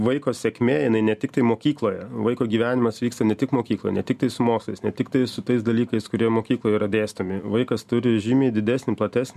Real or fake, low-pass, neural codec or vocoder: real; 14.4 kHz; none